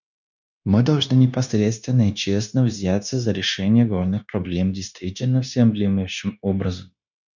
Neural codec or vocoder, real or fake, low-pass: codec, 16 kHz, 0.9 kbps, LongCat-Audio-Codec; fake; 7.2 kHz